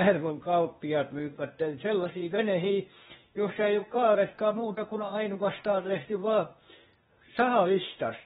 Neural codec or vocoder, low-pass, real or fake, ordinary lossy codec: codec, 16 kHz, 0.8 kbps, ZipCodec; 7.2 kHz; fake; AAC, 16 kbps